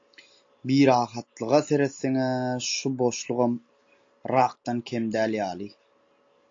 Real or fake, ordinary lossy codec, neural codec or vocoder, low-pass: real; AAC, 48 kbps; none; 7.2 kHz